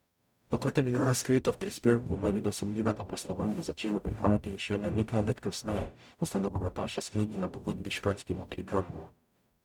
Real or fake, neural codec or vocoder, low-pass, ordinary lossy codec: fake; codec, 44.1 kHz, 0.9 kbps, DAC; 19.8 kHz; none